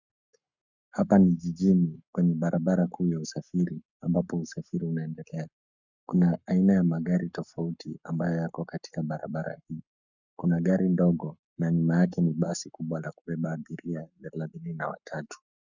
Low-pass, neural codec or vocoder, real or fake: 7.2 kHz; codec, 44.1 kHz, 7.8 kbps, Pupu-Codec; fake